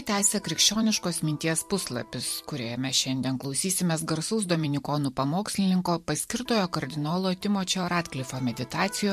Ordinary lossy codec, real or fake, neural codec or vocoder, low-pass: MP3, 64 kbps; fake; vocoder, 44.1 kHz, 128 mel bands every 512 samples, BigVGAN v2; 14.4 kHz